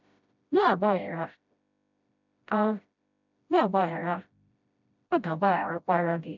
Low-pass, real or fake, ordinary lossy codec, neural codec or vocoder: 7.2 kHz; fake; none; codec, 16 kHz, 0.5 kbps, FreqCodec, smaller model